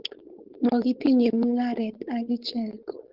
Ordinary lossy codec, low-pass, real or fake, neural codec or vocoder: Opus, 16 kbps; 5.4 kHz; fake; codec, 16 kHz, 4.8 kbps, FACodec